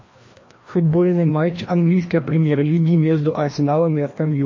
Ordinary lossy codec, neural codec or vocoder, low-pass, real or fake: MP3, 32 kbps; codec, 16 kHz, 1 kbps, FreqCodec, larger model; 7.2 kHz; fake